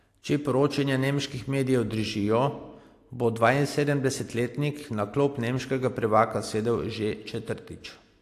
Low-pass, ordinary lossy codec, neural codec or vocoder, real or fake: 14.4 kHz; AAC, 64 kbps; none; real